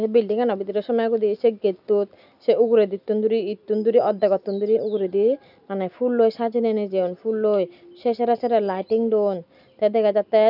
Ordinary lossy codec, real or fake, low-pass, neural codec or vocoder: none; real; 5.4 kHz; none